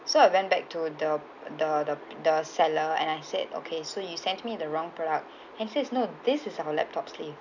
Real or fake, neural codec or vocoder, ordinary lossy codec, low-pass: real; none; none; 7.2 kHz